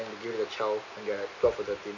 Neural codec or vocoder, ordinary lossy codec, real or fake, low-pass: none; none; real; 7.2 kHz